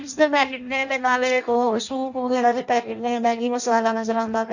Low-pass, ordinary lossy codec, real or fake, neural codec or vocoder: 7.2 kHz; none; fake; codec, 16 kHz in and 24 kHz out, 0.6 kbps, FireRedTTS-2 codec